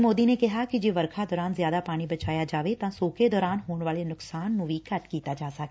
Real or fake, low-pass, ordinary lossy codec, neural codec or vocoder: real; none; none; none